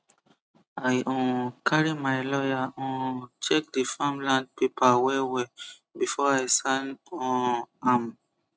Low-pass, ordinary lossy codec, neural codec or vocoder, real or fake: none; none; none; real